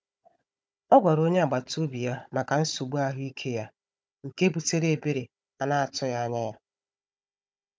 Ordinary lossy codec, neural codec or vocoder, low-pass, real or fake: none; codec, 16 kHz, 16 kbps, FunCodec, trained on Chinese and English, 50 frames a second; none; fake